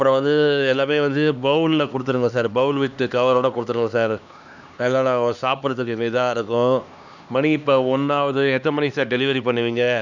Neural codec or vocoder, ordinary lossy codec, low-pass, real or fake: codec, 16 kHz, 2 kbps, X-Codec, HuBERT features, trained on LibriSpeech; none; 7.2 kHz; fake